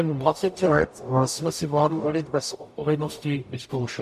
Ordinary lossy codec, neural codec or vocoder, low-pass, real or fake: MP3, 96 kbps; codec, 44.1 kHz, 0.9 kbps, DAC; 14.4 kHz; fake